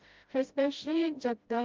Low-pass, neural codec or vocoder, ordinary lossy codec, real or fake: 7.2 kHz; codec, 16 kHz, 0.5 kbps, FreqCodec, smaller model; Opus, 32 kbps; fake